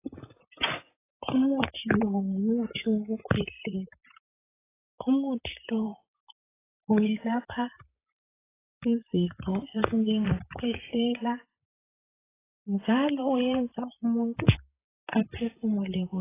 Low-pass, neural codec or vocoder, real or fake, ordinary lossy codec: 3.6 kHz; vocoder, 44.1 kHz, 128 mel bands, Pupu-Vocoder; fake; AAC, 16 kbps